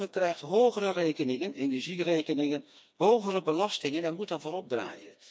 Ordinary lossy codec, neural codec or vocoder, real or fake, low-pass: none; codec, 16 kHz, 2 kbps, FreqCodec, smaller model; fake; none